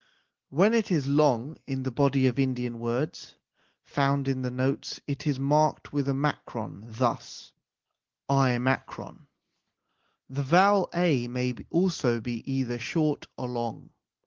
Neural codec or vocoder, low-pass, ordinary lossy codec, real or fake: none; 7.2 kHz; Opus, 16 kbps; real